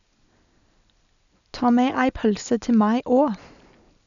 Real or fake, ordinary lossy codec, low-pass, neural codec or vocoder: real; none; 7.2 kHz; none